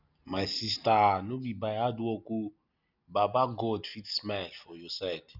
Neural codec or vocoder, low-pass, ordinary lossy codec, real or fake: none; 5.4 kHz; none; real